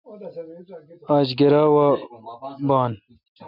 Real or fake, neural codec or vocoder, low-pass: real; none; 5.4 kHz